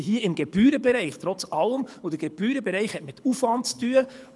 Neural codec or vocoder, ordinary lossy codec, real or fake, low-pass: codec, 24 kHz, 6 kbps, HILCodec; none; fake; none